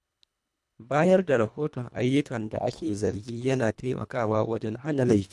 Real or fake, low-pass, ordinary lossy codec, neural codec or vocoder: fake; none; none; codec, 24 kHz, 1.5 kbps, HILCodec